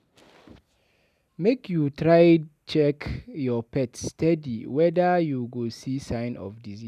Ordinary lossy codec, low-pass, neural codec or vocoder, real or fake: none; 14.4 kHz; none; real